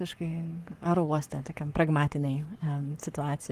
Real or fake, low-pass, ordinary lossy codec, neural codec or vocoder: fake; 14.4 kHz; Opus, 24 kbps; codec, 44.1 kHz, 7.8 kbps, Pupu-Codec